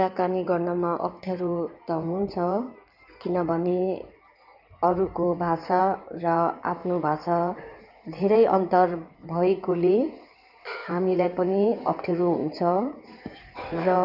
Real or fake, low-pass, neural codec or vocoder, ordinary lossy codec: fake; 5.4 kHz; codec, 16 kHz in and 24 kHz out, 2.2 kbps, FireRedTTS-2 codec; none